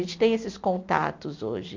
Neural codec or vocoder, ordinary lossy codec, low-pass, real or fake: none; MP3, 64 kbps; 7.2 kHz; real